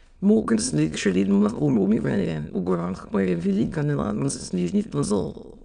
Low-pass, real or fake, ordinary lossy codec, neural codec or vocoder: 9.9 kHz; fake; none; autoencoder, 22.05 kHz, a latent of 192 numbers a frame, VITS, trained on many speakers